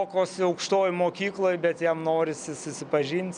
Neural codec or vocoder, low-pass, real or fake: none; 9.9 kHz; real